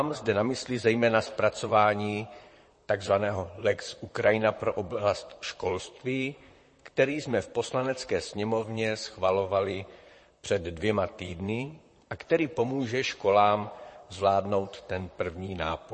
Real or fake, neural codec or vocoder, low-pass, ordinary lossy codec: fake; vocoder, 44.1 kHz, 128 mel bands, Pupu-Vocoder; 10.8 kHz; MP3, 32 kbps